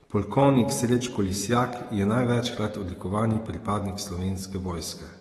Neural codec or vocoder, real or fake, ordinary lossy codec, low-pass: autoencoder, 48 kHz, 128 numbers a frame, DAC-VAE, trained on Japanese speech; fake; AAC, 32 kbps; 19.8 kHz